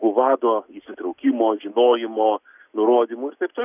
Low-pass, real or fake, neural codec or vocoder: 3.6 kHz; real; none